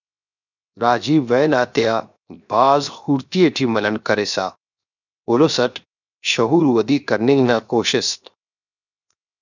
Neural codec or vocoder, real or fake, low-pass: codec, 16 kHz, 0.7 kbps, FocalCodec; fake; 7.2 kHz